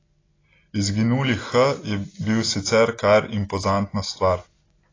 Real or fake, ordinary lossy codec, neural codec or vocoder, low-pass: real; AAC, 32 kbps; none; 7.2 kHz